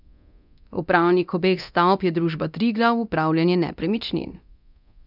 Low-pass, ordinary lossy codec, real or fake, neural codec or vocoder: 5.4 kHz; none; fake; codec, 24 kHz, 0.9 kbps, DualCodec